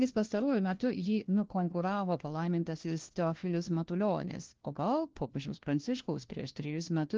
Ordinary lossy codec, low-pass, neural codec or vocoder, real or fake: Opus, 16 kbps; 7.2 kHz; codec, 16 kHz, 1 kbps, FunCodec, trained on LibriTTS, 50 frames a second; fake